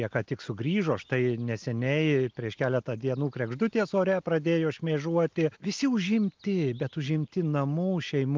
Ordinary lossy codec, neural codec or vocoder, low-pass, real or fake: Opus, 24 kbps; none; 7.2 kHz; real